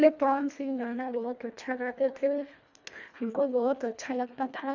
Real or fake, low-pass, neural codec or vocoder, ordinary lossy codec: fake; 7.2 kHz; codec, 24 kHz, 1.5 kbps, HILCodec; none